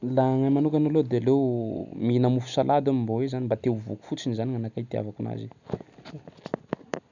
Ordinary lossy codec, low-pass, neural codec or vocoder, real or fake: none; 7.2 kHz; none; real